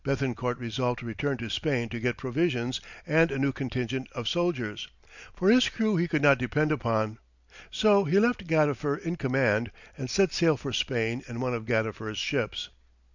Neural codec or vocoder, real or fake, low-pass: none; real; 7.2 kHz